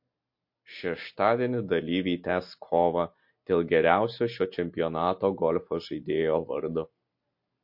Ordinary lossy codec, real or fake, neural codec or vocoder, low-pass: MP3, 32 kbps; real; none; 5.4 kHz